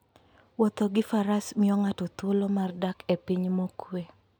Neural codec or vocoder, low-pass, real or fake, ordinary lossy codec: none; none; real; none